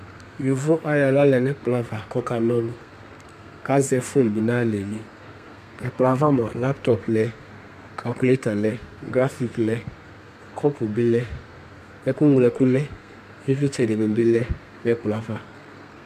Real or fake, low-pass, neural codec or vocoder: fake; 14.4 kHz; codec, 32 kHz, 1.9 kbps, SNAC